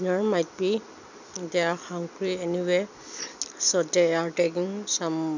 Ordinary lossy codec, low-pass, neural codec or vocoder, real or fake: none; 7.2 kHz; none; real